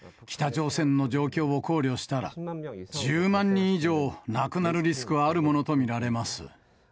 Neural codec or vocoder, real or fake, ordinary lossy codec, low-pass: none; real; none; none